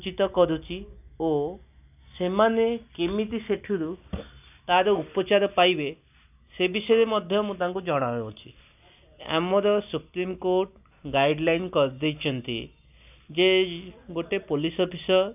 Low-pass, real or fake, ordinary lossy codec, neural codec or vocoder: 3.6 kHz; real; none; none